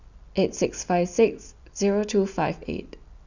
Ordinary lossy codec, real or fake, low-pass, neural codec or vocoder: none; real; 7.2 kHz; none